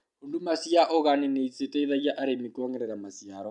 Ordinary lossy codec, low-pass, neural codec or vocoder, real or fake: MP3, 96 kbps; 10.8 kHz; none; real